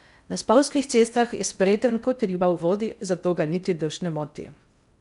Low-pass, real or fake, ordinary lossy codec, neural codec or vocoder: 10.8 kHz; fake; none; codec, 16 kHz in and 24 kHz out, 0.6 kbps, FocalCodec, streaming, 4096 codes